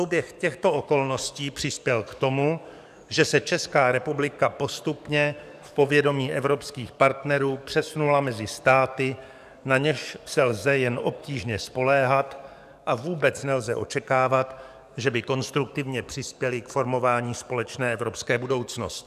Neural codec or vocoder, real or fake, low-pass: codec, 44.1 kHz, 7.8 kbps, DAC; fake; 14.4 kHz